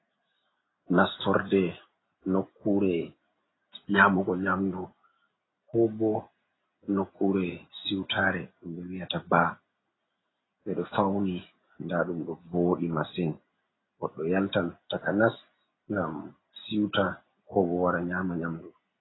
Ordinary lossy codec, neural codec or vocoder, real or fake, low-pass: AAC, 16 kbps; none; real; 7.2 kHz